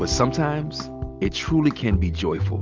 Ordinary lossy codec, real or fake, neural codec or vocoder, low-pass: Opus, 24 kbps; real; none; 7.2 kHz